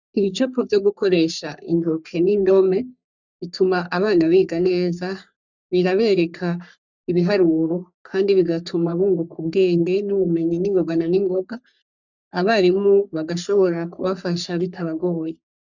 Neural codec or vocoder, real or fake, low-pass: codec, 44.1 kHz, 3.4 kbps, Pupu-Codec; fake; 7.2 kHz